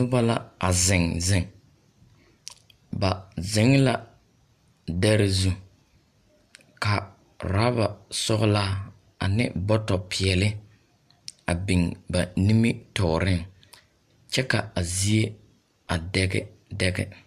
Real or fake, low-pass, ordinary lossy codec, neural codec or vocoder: real; 14.4 kHz; MP3, 96 kbps; none